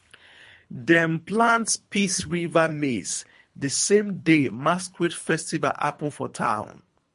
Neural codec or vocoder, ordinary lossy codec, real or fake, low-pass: codec, 24 kHz, 3 kbps, HILCodec; MP3, 48 kbps; fake; 10.8 kHz